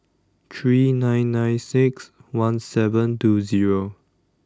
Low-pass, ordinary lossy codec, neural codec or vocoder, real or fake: none; none; none; real